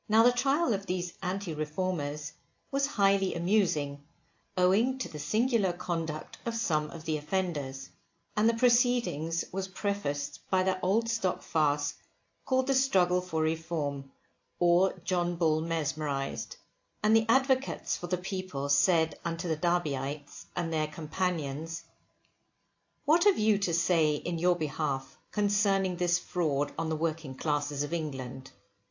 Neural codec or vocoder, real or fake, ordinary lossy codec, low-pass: none; real; AAC, 48 kbps; 7.2 kHz